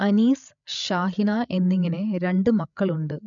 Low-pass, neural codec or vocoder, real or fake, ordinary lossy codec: 7.2 kHz; codec, 16 kHz, 8 kbps, FreqCodec, larger model; fake; MP3, 64 kbps